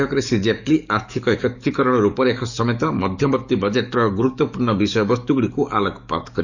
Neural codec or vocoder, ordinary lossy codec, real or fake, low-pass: codec, 44.1 kHz, 7.8 kbps, DAC; none; fake; 7.2 kHz